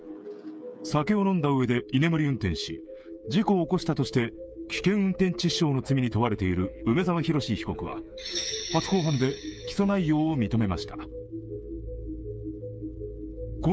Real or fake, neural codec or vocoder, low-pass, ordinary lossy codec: fake; codec, 16 kHz, 8 kbps, FreqCodec, smaller model; none; none